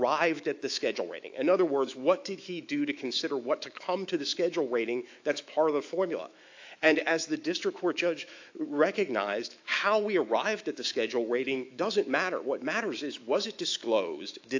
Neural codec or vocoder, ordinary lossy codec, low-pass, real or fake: autoencoder, 48 kHz, 128 numbers a frame, DAC-VAE, trained on Japanese speech; AAC, 48 kbps; 7.2 kHz; fake